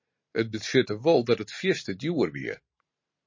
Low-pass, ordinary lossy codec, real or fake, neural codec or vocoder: 7.2 kHz; MP3, 32 kbps; fake; vocoder, 44.1 kHz, 128 mel bands every 512 samples, BigVGAN v2